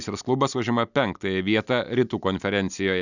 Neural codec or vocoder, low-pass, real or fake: none; 7.2 kHz; real